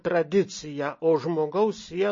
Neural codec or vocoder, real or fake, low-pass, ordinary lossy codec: none; real; 7.2 kHz; MP3, 32 kbps